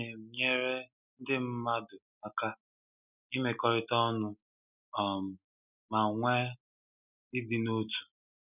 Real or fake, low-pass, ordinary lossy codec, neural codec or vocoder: real; 3.6 kHz; none; none